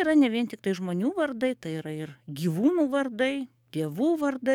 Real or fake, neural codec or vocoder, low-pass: fake; codec, 44.1 kHz, 7.8 kbps, DAC; 19.8 kHz